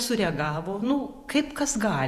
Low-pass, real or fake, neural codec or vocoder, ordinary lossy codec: 14.4 kHz; fake; vocoder, 48 kHz, 128 mel bands, Vocos; Opus, 64 kbps